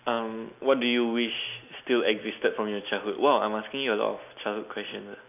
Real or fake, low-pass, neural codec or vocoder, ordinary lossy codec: real; 3.6 kHz; none; none